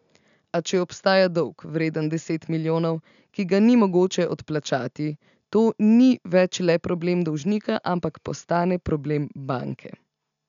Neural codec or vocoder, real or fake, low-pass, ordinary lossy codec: none; real; 7.2 kHz; none